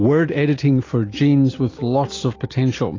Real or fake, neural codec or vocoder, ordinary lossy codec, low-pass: real; none; AAC, 32 kbps; 7.2 kHz